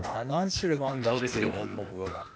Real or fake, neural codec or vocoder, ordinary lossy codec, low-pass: fake; codec, 16 kHz, 0.8 kbps, ZipCodec; none; none